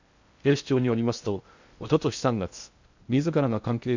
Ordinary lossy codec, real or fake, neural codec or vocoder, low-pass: Opus, 64 kbps; fake; codec, 16 kHz in and 24 kHz out, 0.6 kbps, FocalCodec, streaming, 2048 codes; 7.2 kHz